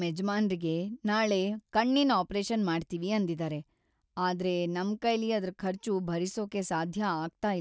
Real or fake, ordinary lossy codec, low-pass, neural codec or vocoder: real; none; none; none